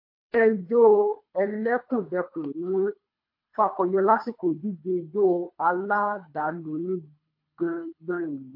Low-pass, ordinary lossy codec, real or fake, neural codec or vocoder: 5.4 kHz; MP3, 32 kbps; fake; codec, 24 kHz, 3 kbps, HILCodec